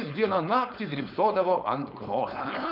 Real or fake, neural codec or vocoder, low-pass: fake; codec, 16 kHz, 4.8 kbps, FACodec; 5.4 kHz